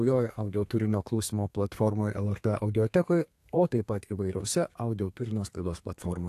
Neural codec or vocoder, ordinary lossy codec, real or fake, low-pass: codec, 32 kHz, 1.9 kbps, SNAC; AAC, 64 kbps; fake; 14.4 kHz